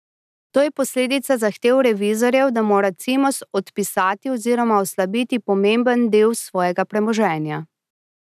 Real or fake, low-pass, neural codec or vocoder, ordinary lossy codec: real; 14.4 kHz; none; none